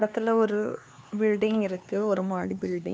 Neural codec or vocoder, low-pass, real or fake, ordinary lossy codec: codec, 16 kHz, 2 kbps, X-Codec, HuBERT features, trained on LibriSpeech; none; fake; none